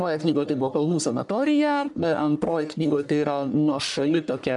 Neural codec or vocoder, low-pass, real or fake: codec, 44.1 kHz, 1.7 kbps, Pupu-Codec; 10.8 kHz; fake